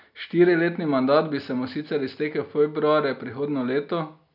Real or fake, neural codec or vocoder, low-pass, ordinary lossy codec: real; none; 5.4 kHz; none